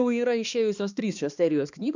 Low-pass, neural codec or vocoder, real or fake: 7.2 kHz; codec, 16 kHz, 2 kbps, X-Codec, HuBERT features, trained on balanced general audio; fake